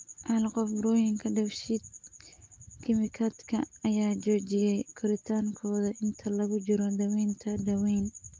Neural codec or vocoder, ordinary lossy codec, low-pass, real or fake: none; Opus, 32 kbps; 7.2 kHz; real